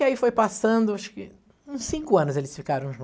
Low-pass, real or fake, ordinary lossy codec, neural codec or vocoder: none; real; none; none